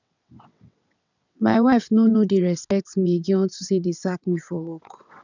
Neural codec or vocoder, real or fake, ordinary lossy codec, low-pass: vocoder, 22.05 kHz, 80 mel bands, WaveNeXt; fake; none; 7.2 kHz